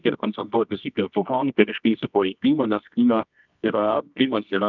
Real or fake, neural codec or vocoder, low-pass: fake; codec, 24 kHz, 0.9 kbps, WavTokenizer, medium music audio release; 7.2 kHz